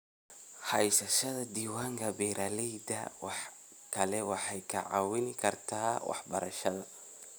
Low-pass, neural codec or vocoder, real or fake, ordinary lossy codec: none; none; real; none